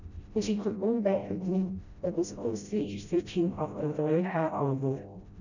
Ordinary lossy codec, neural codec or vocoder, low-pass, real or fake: none; codec, 16 kHz, 0.5 kbps, FreqCodec, smaller model; 7.2 kHz; fake